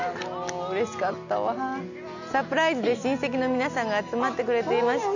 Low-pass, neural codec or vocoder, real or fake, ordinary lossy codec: 7.2 kHz; none; real; none